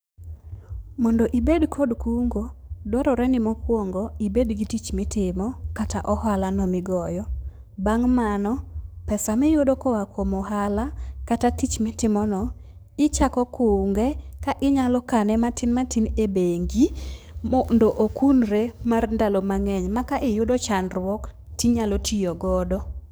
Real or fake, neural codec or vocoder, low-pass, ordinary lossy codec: fake; codec, 44.1 kHz, 7.8 kbps, DAC; none; none